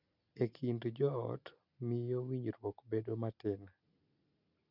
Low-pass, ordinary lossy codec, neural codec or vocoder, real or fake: 5.4 kHz; none; none; real